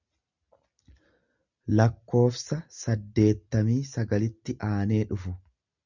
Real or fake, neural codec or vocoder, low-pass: real; none; 7.2 kHz